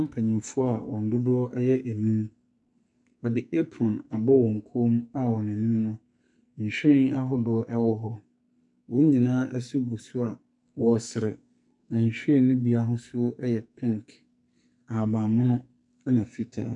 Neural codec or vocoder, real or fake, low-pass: codec, 44.1 kHz, 2.6 kbps, SNAC; fake; 10.8 kHz